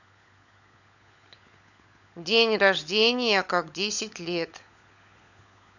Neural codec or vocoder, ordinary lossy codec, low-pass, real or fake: codec, 16 kHz, 16 kbps, FunCodec, trained on LibriTTS, 50 frames a second; none; 7.2 kHz; fake